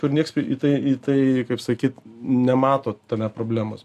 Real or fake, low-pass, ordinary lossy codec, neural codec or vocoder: real; 14.4 kHz; MP3, 96 kbps; none